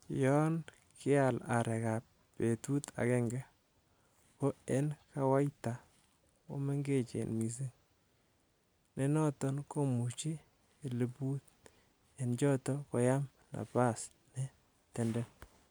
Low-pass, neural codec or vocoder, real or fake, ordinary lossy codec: none; none; real; none